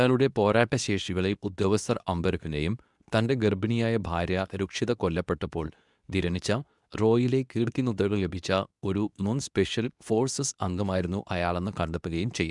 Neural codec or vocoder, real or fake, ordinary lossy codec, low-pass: codec, 24 kHz, 0.9 kbps, WavTokenizer, medium speech release version 2; fake; none; 10.8 kHz